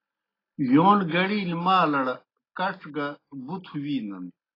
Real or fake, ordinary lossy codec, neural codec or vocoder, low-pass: real; AAC, 24 kbps; none; 5.4 kHz